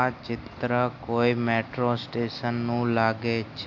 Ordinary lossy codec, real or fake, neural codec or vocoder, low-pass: AAC, 48 kbps; real; none; 7.2 kHz